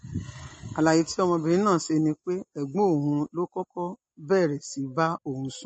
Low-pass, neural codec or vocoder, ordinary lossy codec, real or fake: 9.9 kHz; none; MP3, 32 kbps; real